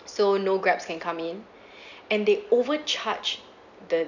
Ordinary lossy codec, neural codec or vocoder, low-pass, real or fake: none; none; 7.2 kHz; real